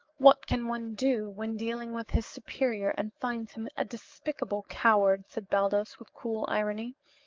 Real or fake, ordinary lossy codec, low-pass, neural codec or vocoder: fake; Opus, 24 kbps; 7.2 kHz; codec, 44.1 kHz, 7.8 kbps, DAC